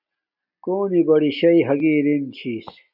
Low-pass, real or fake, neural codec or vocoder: 5.4 kHz; real; none